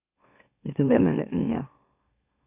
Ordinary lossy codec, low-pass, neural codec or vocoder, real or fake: none; 3.6 kHz; autoencoder, 44.1 kHz, a latent of 192 numbers a frame, MeloTTS; fake